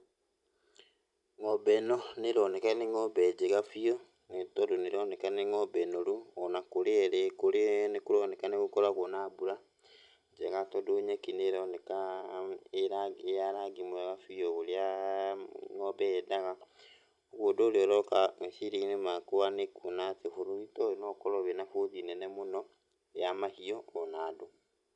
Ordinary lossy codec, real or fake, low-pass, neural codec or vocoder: none; real; 10.8 kHz; none